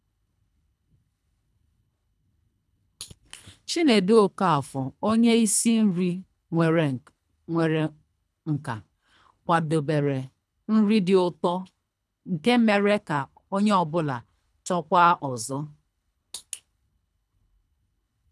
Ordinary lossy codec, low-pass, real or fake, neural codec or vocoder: none; none; fake; codec, 24 kHz, 3 kbps, HILCodec